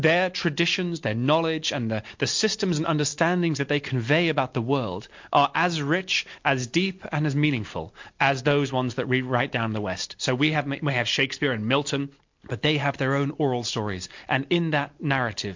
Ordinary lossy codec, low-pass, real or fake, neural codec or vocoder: MP3, 48 kbps; 7.2 kHz; real; none